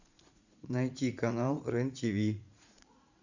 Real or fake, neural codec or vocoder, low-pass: fake; vocoder, 44.1 kHz, 80 mel bands, Vocos; 7.2 kHz